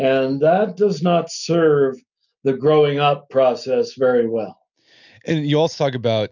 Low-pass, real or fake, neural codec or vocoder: 7.2 kHz; real; none